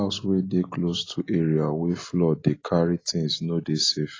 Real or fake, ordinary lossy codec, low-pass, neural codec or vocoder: real; AAC, 32 kbps; 7.2 kHz; none